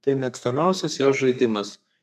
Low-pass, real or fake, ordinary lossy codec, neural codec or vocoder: 14.4 kHz; fake; AAC, 96 kbps; codec, 32 kHz, 1.9 kbps, SNAC